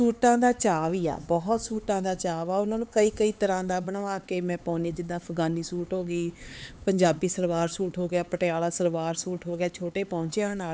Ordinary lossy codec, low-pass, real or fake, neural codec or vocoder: none; none; fake; codec, 16 kHz, 4 kbps, X-Codec, HuBERT features, trained on LibriSpeech